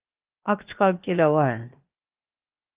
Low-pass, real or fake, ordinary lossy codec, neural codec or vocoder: 3.6 kHz; fake; Opus, 32 kbps; codec, 16 kHz, 0.7 kbps, FocalCodec